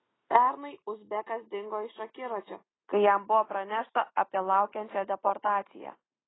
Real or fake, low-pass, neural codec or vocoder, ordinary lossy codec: real; 7.2 kHz; none; AAC, 16 kbps